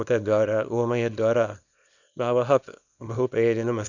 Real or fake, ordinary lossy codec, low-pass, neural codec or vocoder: fake; none; 7.2 kHz; codec, 24 kHz, 0.9 kbps, WavTokenizer, small release